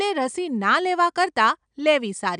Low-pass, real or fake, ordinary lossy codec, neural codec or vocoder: 9.9 kHz; real; none; none